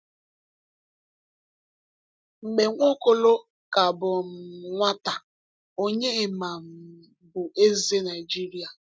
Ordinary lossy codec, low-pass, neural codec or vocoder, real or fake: none; none; none; real